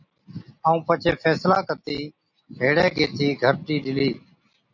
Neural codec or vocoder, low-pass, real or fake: none; 7.2 kHz; real